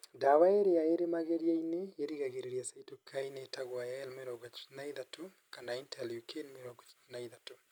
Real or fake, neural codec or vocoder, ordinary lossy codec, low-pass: real; none; none; none